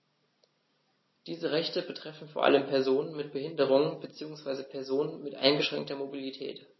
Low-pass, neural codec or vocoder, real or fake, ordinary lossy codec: 7.2 kHz; none; real; MP3, 24 kbps